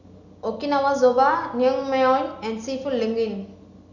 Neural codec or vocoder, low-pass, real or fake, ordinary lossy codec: none; 7.2 kHz; real; none